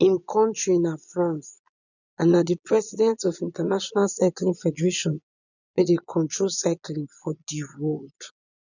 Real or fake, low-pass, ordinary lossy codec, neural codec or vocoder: fake; 7.2 kHz; none; vocoder, 22.05 kHz, 80 mel bands, Vocos